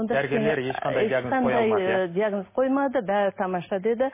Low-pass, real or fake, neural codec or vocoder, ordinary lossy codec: 3.6 kHz; real; none; MP3, 16 kbps